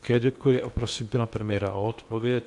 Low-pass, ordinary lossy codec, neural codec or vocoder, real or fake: 10.8 kHz; AAC, 64 kbps; codec, 16 kHz in and 24 kHz out, 0.8 kbps, FocalCodec, streaming, 65536 codes; fake